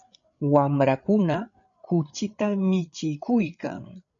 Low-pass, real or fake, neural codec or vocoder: 7.2 kHz; fake; codec, 16 kHz, 8 kbps, FreqCodec, larger model